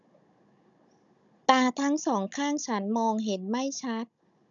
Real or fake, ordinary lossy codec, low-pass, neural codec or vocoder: fake; none; 7.2 kHz; codec, 16 kHz, 16 kbps, FunCodec, trained on Chinese and English, 50 frames a second